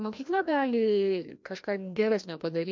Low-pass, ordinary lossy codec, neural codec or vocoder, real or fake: 7.2 kHz; MP3, 48 kbps; codec, 16 kHz, 1 kbps, FreqCodec, larger model; fake